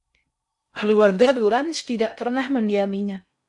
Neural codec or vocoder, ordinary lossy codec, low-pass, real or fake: codec, 16 kHz in and 24 kHz out, 0.6 kbps, FocalCodec, streaming, 4096 codes; AAC, 64 kbps; 10.8 kHz; fake